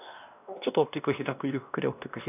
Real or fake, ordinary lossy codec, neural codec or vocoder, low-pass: fake; none; codec, 16 kHz, 1 kbps, X-Codec, WavLM features, trained on Multilingual LibriSpeech; 3.6 kHz